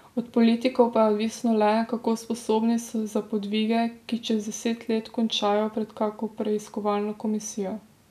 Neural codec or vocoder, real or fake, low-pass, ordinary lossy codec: none; real; 14.4 kHz; none